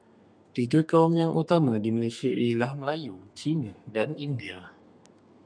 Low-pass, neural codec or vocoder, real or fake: 9.9 kHz; codec, 32 kHz, 1.9 kbps, SNAC; fake